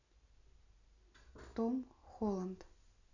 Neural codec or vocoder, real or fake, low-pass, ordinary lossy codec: none; real; 7.2 kHz; none